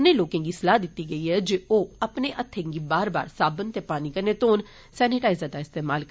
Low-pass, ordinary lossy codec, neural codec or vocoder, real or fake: none; none; none; real